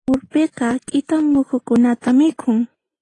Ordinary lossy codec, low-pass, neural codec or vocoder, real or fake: AAC, 32 kbps; 10.8 kHz; none; real